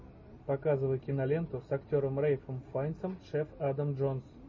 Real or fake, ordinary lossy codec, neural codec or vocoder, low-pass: real; MP3, 32 kbps; none; 7.2 kHz